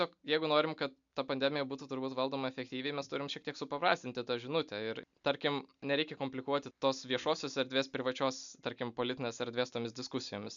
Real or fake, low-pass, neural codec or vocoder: real; 7.2 kHz; none